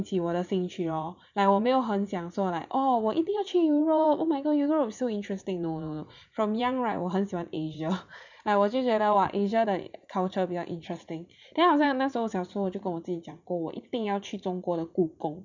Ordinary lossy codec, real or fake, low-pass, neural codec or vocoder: none; fake; 7.2 kHz; vocoder, 44.1 kHz, 80 mel bands, Vocos